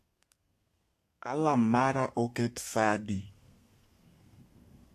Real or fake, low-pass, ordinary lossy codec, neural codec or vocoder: fake; 14.4 kHz; AAC, 64 kbps; codec, 32 kHz, 1.9 kbps, SNAC